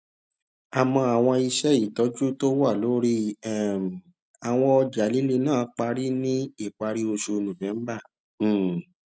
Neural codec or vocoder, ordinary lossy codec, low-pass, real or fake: none; none; none; real